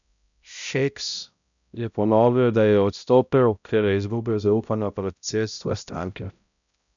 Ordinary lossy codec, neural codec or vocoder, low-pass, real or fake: none; codec, 16 kHz, 0.5 kbps, X-Codec, HuBERT features, trained on balanced general audio; 7.2 kHz; fake